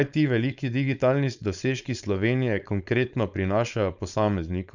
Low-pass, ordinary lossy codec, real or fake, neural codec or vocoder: 7.2 kHz; none; fake; codec, 16 kHz, 4.8 kbps, FACodec